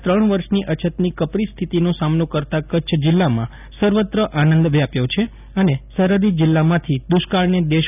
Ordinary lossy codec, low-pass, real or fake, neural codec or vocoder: none; 3.6 kHz; real; none